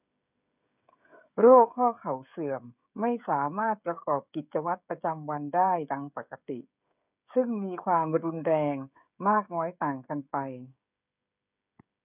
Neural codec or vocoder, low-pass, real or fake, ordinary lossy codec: codec, 16 kHz, 16 kbps, FreqCodec, smaller model; 3.6 kHz; fake; none